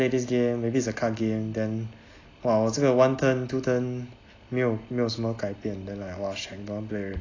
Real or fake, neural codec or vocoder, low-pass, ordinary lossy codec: real; none; 7.2 kHz; AAC, 32 kbps